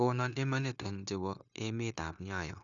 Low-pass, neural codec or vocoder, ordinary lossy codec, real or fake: 7.2 kHz; codec, 16 kHz, 0.9 kbps, LongCat-Audio-Codec; none; fake